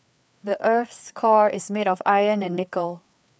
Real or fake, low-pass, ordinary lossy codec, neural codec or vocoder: fake; none; none; codec, 16 kHz, 4 kbps, FreqCodec, larger model